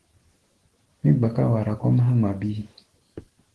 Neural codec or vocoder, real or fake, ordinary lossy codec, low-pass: autoencoder, 48 kHz, 128 numbers a frame, DAC-VAE, trained on Japanese speech; fake; Opus, 16 kbps; 10.8 kHz